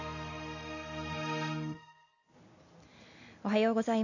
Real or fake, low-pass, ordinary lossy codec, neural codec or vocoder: real; 7.2 kHz; none; none